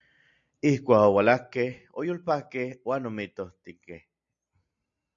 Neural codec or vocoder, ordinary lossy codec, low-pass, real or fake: none; AAC, 64 kbps; 7.2 kHz; real